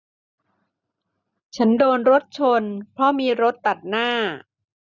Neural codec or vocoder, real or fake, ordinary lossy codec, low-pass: none; real; none; 7.2 kHz